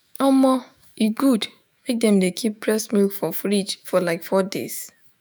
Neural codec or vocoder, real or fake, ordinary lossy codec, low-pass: autoencoder, 48 kHz, 128 numbers a frame, DAC-VAE, trained on Japanese speech; fake; none; none